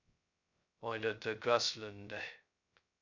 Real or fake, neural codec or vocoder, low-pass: fake; codec, 16 kHz, 0.2 kbps, FocalCodec; 7.2 kHz